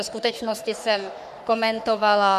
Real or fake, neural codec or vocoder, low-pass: fake; codec, 44.1 kHz, 3.4 kbps, Pupu-Codec; 14.4 kHz